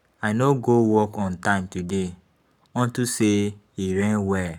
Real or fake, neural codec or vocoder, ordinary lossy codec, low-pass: fake; codec, 44.1 kHz, 7.8 kbps, Pupu-Codec; none; 19.8 kHz